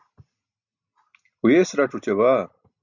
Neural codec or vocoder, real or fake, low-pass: none; real; 7.2 kHz